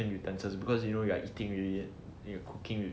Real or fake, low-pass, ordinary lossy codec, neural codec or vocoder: real; none; none; none